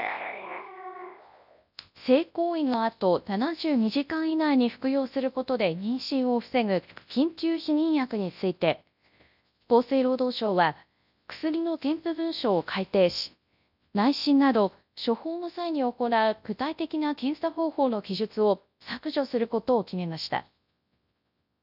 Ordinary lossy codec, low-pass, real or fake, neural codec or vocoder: none; 5.4 kHz; fake; codec, 24 kHz, 0.9 kbps, WavTokenizer, large speech release